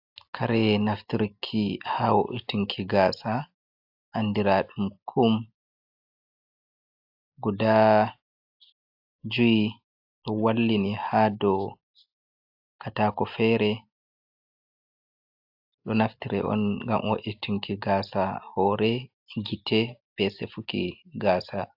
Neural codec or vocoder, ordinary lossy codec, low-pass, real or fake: none; AAC, 48 kbps; 5.4 kHz; real